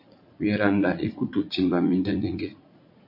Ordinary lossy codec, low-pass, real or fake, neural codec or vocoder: MP3, 32 kbps; 5.4 kHz; fake; vocoder, 44.1 kHz, 80 mel bands, Vocos